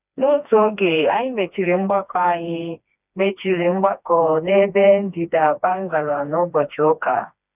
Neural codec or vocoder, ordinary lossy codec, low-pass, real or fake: codec, 16 kHz, 2 kbps, FreqCodec, smaller model; none; 3.6 kHz; fake